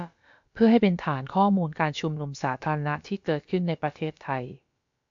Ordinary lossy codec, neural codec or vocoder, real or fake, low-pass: AAC, 64 kbps; codec, 16 kHz, about 1 kbps, DyCAST, with the encoder's durations; fake; 7.2 kHz